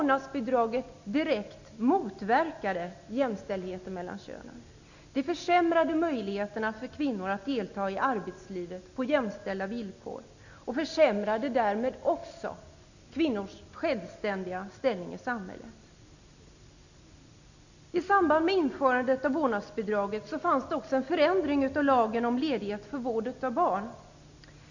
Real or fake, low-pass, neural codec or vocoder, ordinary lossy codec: real; 7.2 kHz; none; none